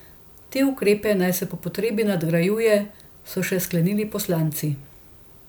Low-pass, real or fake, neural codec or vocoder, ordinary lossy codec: none; real; none; none